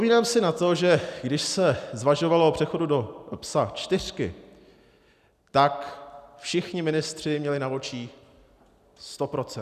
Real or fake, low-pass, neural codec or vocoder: real; 14.4 kHz; none